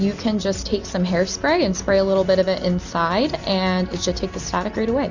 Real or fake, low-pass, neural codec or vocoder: real; 7.2 kHz; none